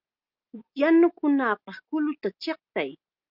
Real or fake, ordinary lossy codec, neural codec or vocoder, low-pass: real; Opus, 24 kbps; none; 5.4 kHz